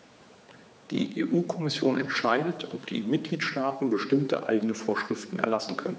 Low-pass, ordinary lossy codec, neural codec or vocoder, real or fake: none; none; codec, 16 kHz, 4 kbps, X-Codec, HuBERT features, trained on general audio; fake